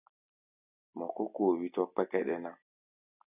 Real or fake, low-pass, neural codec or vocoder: real; 3.6 kHz; none